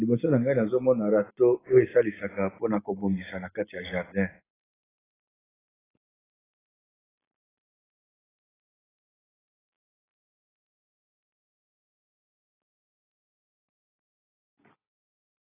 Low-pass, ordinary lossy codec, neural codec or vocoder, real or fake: 3.6 kHz; AAC, 16 kbps; none; real